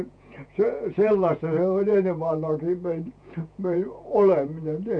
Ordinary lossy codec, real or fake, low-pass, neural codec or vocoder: none; fake; 9.9 kHz; vocoder, 48 kHz, 128 mel bands, Vocos